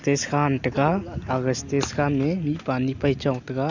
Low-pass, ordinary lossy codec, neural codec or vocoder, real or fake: 7.2 kHz; none; none; real